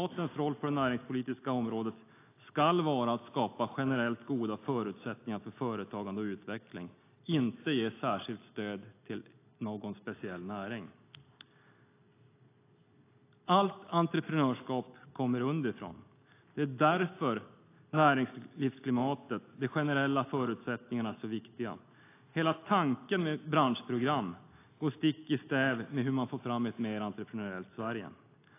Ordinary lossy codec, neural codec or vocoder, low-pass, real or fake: AAC, 24 kbps; none; 3.6 kHz; real